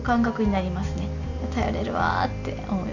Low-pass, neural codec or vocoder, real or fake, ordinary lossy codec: 7.2 kHz; none; real; none